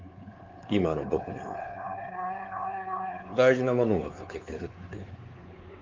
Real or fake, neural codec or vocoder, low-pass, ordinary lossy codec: fake; codec, 16 kHz, 4 kbps, X-Codec, WavLM features, trained on Multilingual LibriSpeech; 7.2 kHz; Opus, 32 kbps